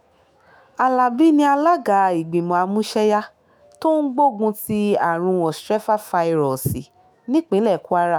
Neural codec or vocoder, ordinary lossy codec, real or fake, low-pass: autoencoder, 48 kHz, 128 numbers a frame, DAC-VAE, trained on Japanese speech; none; fake; none